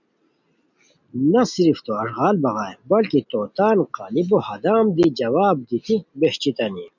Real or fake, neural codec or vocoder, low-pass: real; none; 7.2 kHz